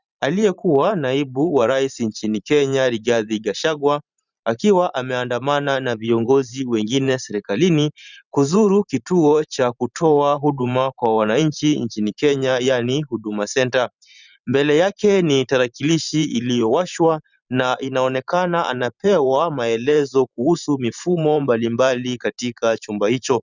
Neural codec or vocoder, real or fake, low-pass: vocoder, 44.1 kHz, 128 mel bands every 512 samples, BigVGAN v2; fake; 7.2 kHz